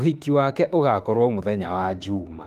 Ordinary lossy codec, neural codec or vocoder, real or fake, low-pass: Opus, 32 kbps; autoencoder, 48 kHz, 32 numbers a frame, DAC-VAE, trained on Japanese speech; fake; 14.4 kHz